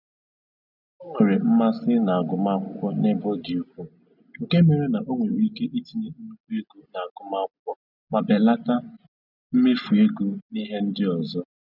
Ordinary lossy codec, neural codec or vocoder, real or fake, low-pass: none; none; real; 5.4 kHz